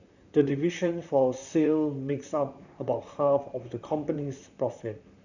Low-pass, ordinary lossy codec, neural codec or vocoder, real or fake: 7.2 kHz; none; vocoder, 44.1 kHz, 128 mel bands, Pupu-Vocoder; fake